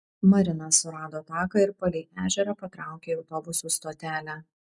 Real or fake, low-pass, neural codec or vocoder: real; 10.8 kHz; none